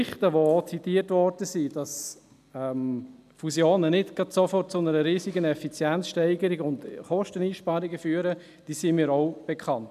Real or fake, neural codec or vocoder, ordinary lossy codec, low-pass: real; none; none; 14.4 kHz